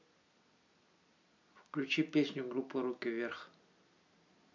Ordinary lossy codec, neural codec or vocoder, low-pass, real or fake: MP3, 64 kbps; none; 7.2 kHz; real